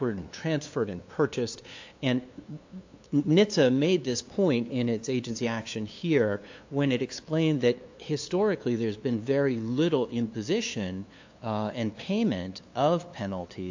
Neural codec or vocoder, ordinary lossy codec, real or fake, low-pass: codec, 16 kHz, 2 kbps, FunCodec, trained on LibriTTS, 25 frames a second; AAC, 48 kbps; fake; 7.2 kHz